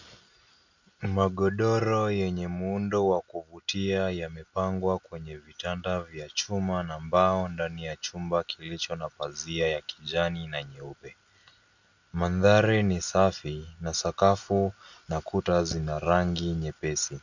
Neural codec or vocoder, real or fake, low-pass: none; real; 7.2 kHz